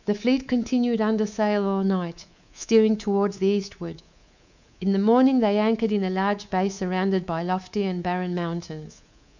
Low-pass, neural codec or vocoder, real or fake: 7.2 kHz; codec, 24 kHz, 3.1 kbps, DualCodec; fake